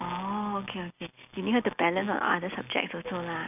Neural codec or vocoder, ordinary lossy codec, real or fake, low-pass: none; none; real; 3.6 kHz